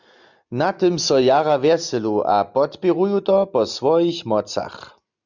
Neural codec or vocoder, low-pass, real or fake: none; 7.2 kHz; real